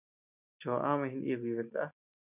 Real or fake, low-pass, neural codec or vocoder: real; 3.6 kHz; none